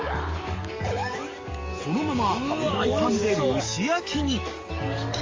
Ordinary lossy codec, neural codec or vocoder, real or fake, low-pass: Opus, 32 kbps; codec, 44.1 kHz, 7.8 kbps, DAC; fake; 7.2 kHz